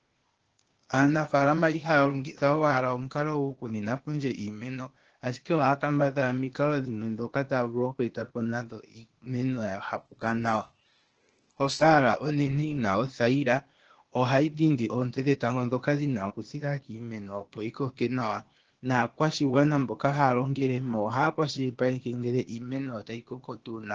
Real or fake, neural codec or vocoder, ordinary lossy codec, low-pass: fake; codec, 16 kHz, 0.8 kbps, ZipCodec; Opus, 16 kbps; 7.2 kHz